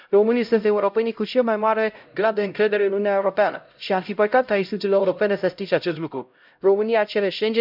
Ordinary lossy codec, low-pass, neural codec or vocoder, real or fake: none; 5.4 kHz; codec, 16 kHz, 0.5 kbps, X-Codec, HuBERT features, trained on LibriSpeech; fake